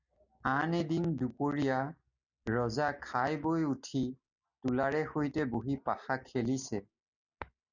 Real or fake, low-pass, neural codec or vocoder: real; 7.2 kHz; none